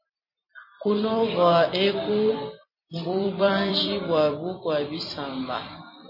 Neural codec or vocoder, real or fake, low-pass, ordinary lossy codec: vocoder, 44.1 kHz, 128 mel bands every 256 samples, BigVGAN v2; fake; 5.4 kHz; MP3, 24 kbps